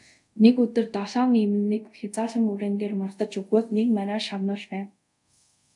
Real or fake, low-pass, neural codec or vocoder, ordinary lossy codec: fake; 10.8 kHz; codec, 24 kHz, 0.5 kbps, DualCodec; MP3, 96 kbps